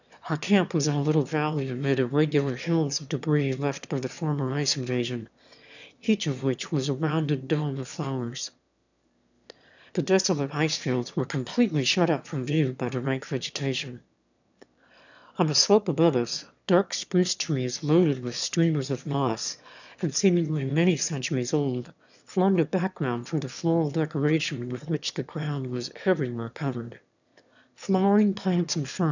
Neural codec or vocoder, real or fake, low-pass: autoencoder, 22.05 kHz, a latent of 192 numbers a frame, VITS, trained on one speaker; fake; 7.2 kHz